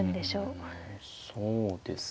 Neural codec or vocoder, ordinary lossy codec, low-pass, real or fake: none; none; none; real